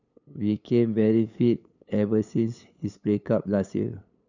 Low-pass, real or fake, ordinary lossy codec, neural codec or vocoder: 7.2 kHz; fake; none; codec, 16 kHz, 8 kbps, FunCodec, trained on LibriTTS, 25 frames a second